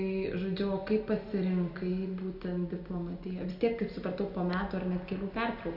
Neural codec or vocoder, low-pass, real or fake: none; 5.4 kHz; real